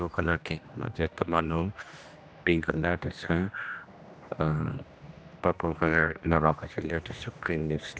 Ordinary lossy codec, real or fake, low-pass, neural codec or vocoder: none; fake; none; codec, 16 kHz, 1 kbps, X-Codec, HuBERT features, trained on general audio